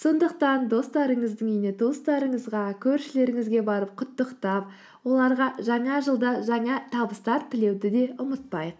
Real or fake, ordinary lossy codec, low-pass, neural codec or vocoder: real; none; none; none